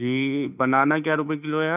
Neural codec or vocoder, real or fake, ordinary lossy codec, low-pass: autoencoder, 48 kHz, 32 numbers a frame, DAC-VAE, trained on Japanese speech; fake; none; 3.6 kHz